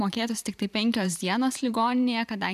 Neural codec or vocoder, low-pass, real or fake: vocoder, 44.1 kHz, 128 mel bands every 512 samples, BigVGAN v2; 14.4 kHz; fake